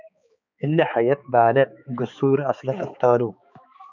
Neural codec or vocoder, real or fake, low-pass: codec, 16 kHz, 4 kbps, X-Codec, HuBERT features, trained on balanced general audio; fake; 7.2 kHz